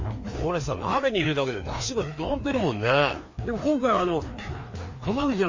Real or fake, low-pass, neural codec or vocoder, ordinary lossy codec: fake; 7.2 kHz; codec, 16 kHz, 2 kbps, FreqCodec, larger model; MP3, 32 kbps